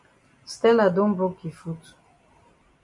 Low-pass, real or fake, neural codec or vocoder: 10.8 kHz; real; none